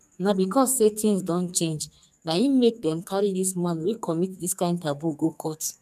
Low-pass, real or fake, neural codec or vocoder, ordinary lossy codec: 14.4 kHz; fake; codec, 44.1 kHz, 2.6 kbps, SNAC; none